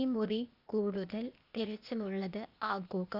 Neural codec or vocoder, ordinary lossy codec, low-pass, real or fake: codec, 16 kHz, 0.8 kbps, ZipCodec; none; 5.4 kHz; fake